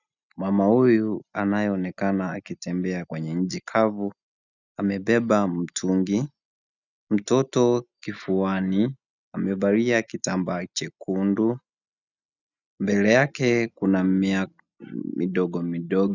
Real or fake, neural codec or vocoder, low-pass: real; none; 7.2 kHz